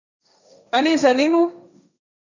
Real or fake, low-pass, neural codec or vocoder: fake; 7.2 kHz; codec, 16 kHz, 1.1 kbps, Voila-Tokenizer